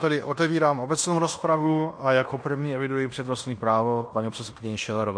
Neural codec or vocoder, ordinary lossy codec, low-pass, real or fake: codec, 16 kHz in and 24 kHz out, 0.9 kbps, LongCat-Audio-Codec, fine tuned four codebook decoder; MP3, 48 kbps; 9.9 kHz; fake